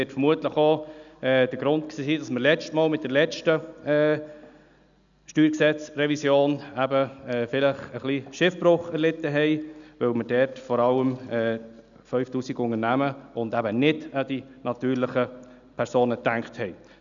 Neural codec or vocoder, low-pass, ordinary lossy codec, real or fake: none; 7.2 kHz; none; real